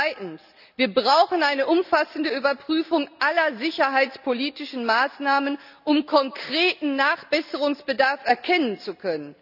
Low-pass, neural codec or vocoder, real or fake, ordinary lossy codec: 5.4 kHz; none; real; none